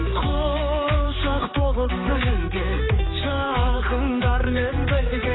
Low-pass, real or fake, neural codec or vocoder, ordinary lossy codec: 7.2 kHz; fake; codec, 16 kHz, 4 kbps, X-Codec, HuBERT features, trained on general audio; AAC, 16 kbps